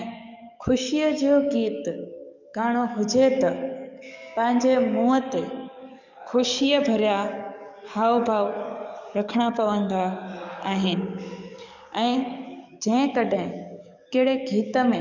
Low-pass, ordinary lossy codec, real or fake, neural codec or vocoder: 7.2 kHz; none; fake; codec, 44.1 kHz, 7.8 kbps, DAC